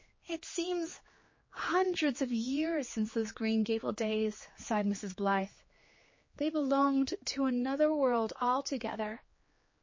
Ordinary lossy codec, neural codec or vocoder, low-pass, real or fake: MP3, 32 kbps; codec, 16 kHz, 4 kbps, X-Codec, HuBERT features, trained on general audio; 7.2 kHz; fake